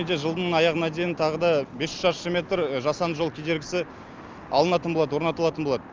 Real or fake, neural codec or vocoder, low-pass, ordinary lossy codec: real; none; 7.2 kHz; Opus, 24 kbps